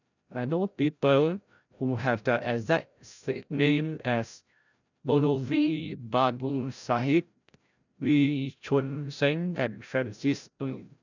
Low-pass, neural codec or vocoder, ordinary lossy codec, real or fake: 7.2 kHz; codec, 16 kHz, 0.5 kbps, FreqCodec, larger model; none; fake